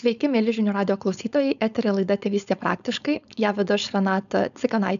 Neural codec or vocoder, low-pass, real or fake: codec, 16 kHz, 4.8 kbps, FACodec; 7.2 kHz; fake